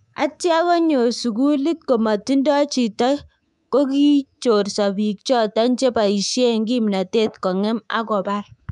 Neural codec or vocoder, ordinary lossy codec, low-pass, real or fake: codec, 24 kHz, 3.1 kbps, DualCodec; MP3, 96 kbps; 10.8 kHz; fake